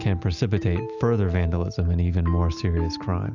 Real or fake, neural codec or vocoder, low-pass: real; none; 7.2 kHz